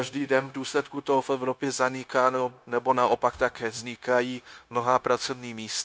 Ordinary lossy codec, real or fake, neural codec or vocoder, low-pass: none; fake; codec, 16 kHz, 0.9 kbps, LongCat-Audio-Codec; none